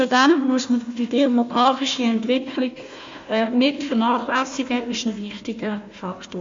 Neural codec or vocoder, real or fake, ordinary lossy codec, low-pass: codec, 16 kHz, 1 kbps, FunCodec, trained on Chinese and English, 50 frames a second; fake; MP3, 48 kbps; 7.2 kHz